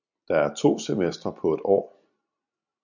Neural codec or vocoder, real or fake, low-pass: none; real; 7.2 kHz